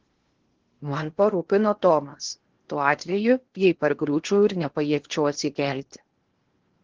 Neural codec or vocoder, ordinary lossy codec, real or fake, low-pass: codec, 16 kHz in and 24 kHz out, 0.8 kbps, FocalCodec, streaming, 65536 codes; Opus, 16 kbps; fake; 7.2 kHz